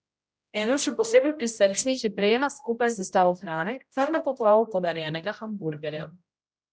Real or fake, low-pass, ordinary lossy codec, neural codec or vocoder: fake; none; none; codec, 16 kHz, 0.5 kbps, X-Codec, HuBERT features, trained on general audio